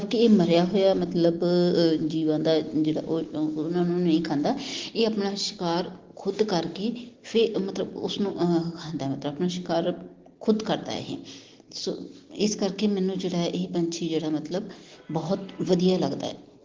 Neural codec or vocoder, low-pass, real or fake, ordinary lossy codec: none; 7.2 kHz; real; Opus, 16 kbps